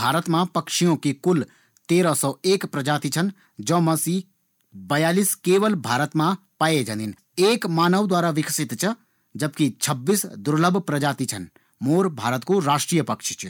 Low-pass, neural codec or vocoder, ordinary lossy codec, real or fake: 19.8 kHz; none; none; real